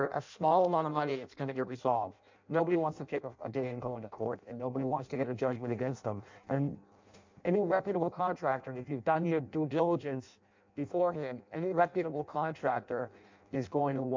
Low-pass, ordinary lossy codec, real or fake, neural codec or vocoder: 7.2 kHz; MP3, 64 kbps; fake; codec, 16 kHz in and 24 kHz out, 0.6 kbps, FireRedTTS-2 codec